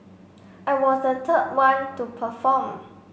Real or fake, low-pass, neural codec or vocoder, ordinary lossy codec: real; none; none; none